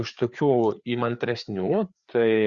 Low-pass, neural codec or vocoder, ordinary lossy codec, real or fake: 7.2 kHz; codec, 16 kHz, 4 kbps, FreqCodec, larger model; Opus, 64 kbps; fake